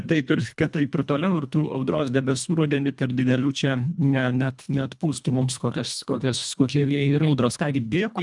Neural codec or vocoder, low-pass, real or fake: codec, 24 kHz, 1.5 kbps, HILCodec; 10.8 kHz; fake